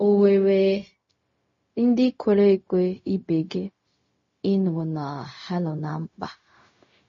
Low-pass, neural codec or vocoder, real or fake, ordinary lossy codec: 7.2 kHz; codec, 16 kHz, 0.4 kbps, LongCat-Audio-Codec; fake; MP3, 32 kbps